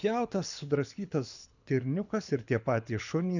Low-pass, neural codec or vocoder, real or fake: 7.2 kHz; codec, 24 kHz, 6 kbps, HILCodec; fake